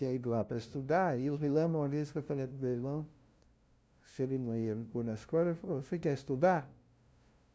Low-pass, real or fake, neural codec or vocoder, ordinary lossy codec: none; fake; codec, 16 kHz, 0.5 kbps, FunCodec, trained on LibriTTS, 25 frames a second; none